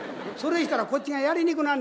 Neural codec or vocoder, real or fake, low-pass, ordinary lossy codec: none; real; none; none